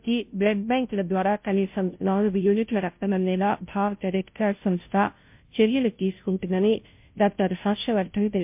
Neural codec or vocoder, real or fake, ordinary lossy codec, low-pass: codec, 16 kHz, 0.5 kbps, FunCodec, trained on Chinese and English, 25 frames a second; fake; MP3, 32 kbps; 3.6 kHz